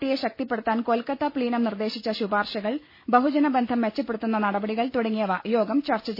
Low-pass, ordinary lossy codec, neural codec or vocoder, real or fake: 5.4 kHz; MP3, 24 kbps; none; real